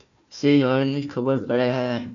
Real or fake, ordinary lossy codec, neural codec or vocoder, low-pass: fake; MP3, 96 kbps; codec, 16 kHz, 1 kbps, FunCodec, trained on Chinese and English, 50 frames a second; 7.2 kHz